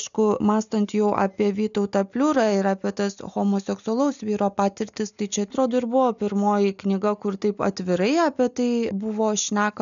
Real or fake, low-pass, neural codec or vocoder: real; 7.2 kHz; none